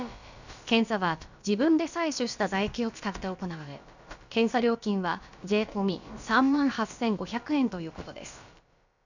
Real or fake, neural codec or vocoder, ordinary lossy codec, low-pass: fake; codec, 16 kHz, about 1 kbps, DyCAST, with the encoder's durations; none; 7.2 kHz